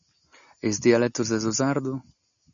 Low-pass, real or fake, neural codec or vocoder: 7.2 kHz; real; none